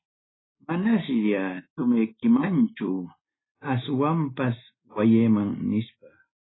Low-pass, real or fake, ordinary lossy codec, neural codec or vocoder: 7.2 kHz; real; AAC, 16 kbps; none